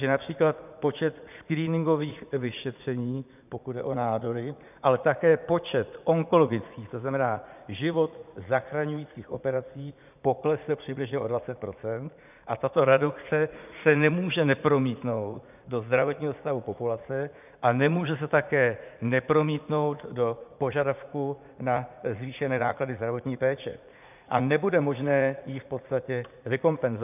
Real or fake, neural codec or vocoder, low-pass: fake; vocoder, 44.1 kHz, 80 mel bands, Vocos; 3.6 kHz